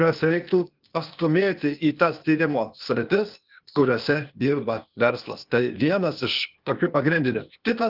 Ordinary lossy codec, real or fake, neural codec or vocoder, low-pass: Opus, 24 kbps; fake; codec, 16 kHz, 0.8 kbps, ZipCodec; 5.4 kHz